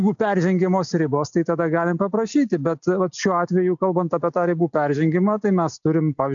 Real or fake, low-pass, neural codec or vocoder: real; 7.2 kHz; none